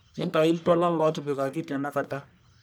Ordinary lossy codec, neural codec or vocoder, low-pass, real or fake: none; codec, 44.1 kHz, 1.7 kbps, Pupu-Codec; none; fake